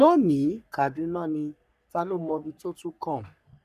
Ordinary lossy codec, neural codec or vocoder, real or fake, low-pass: none; codec, 44.1 kHz, 3.4 kbps, Pupu-Codec; fake; 14.4 kHz